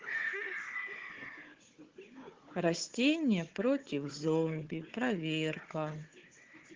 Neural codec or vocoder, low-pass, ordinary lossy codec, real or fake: codec, 16 kHz, 4 kbps, FunCodec, trained on Chinese and English, 50 frames a second; 7.2 kHz; Opus, 16 kbps; fake